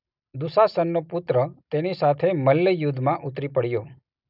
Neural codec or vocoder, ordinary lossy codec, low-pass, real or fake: none; none; 5.4 kHz; real